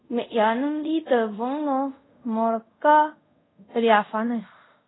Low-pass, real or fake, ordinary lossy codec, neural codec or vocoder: 7.2 kHz; fake; AAC, 16 kbps; codec, 24 kHz, 0.5 kbps, DualCodec